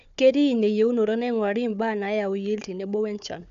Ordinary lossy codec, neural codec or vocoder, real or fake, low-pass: none; codec, 16 kHz, 4 kbps, FunCodec, trained on Chinese and English, 50 frames a second; fake; 7.2 kHz